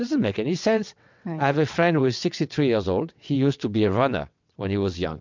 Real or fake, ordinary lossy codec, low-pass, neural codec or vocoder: fake; MP3, 64 kbps; 7.2 kHz; vocoder, 22.05 kHz, 80 mel bands, WaveNeXt